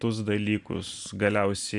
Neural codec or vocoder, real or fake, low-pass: none; real; 10.8 kHz